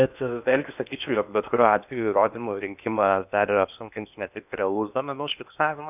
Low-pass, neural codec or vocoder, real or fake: 3.6 kHz; codec, 16 kHz in and 24 kHz out, 0.8 kbps, FocalCodec, streaming, 65536 codes; fake